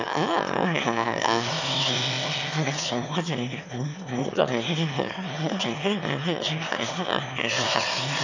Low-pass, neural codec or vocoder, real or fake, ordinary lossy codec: 7.2 kHz; autoencoder, 22.05 kHz, a latent of 192 numbers a frame, VITS, trained on one speaker; fake; none